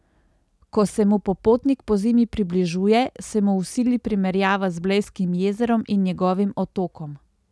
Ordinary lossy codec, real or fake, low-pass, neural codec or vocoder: none; real; none; none